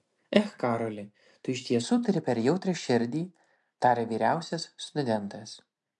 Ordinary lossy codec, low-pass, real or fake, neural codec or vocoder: MP3, 64 kbps; 10.8 kHz; real; none